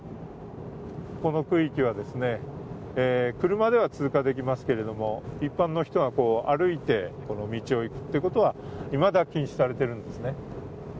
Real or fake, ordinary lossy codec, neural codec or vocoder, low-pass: real; none; none; none